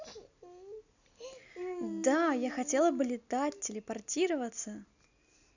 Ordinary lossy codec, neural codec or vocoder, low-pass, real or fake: none; none; 7.2 kHz; real